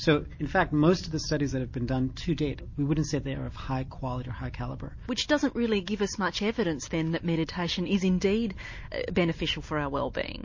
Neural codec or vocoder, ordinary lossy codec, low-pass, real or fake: none; MP3, 32 kbps; 7.2 kHz; real